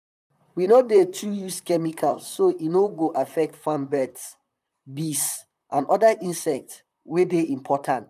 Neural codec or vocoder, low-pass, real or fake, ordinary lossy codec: vocoder, 44.1 kHz, 128 mel bands, Pupu-Vocoder; 14.4 kHz; fake; none